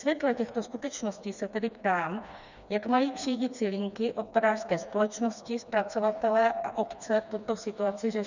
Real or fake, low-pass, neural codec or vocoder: fake; 7.2 kHz; codec, 16 kHz, 2 kbps, FreqCodec, smaller model